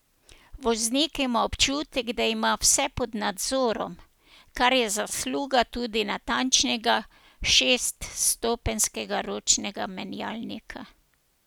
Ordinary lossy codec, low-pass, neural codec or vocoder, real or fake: none; none; none; real